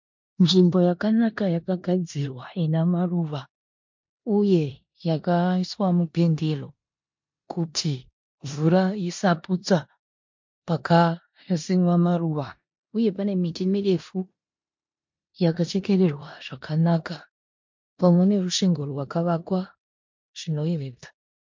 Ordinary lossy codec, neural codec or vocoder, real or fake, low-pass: MP3, 48 kbps; codec, 16 kHz in and 24 kHz out, 0.9 kbps, LongCat-Audio-Codec, four codebook decoder; fake; 7.2 kHz